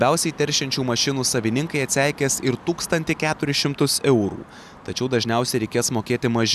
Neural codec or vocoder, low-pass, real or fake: none; 14.4 kHz; real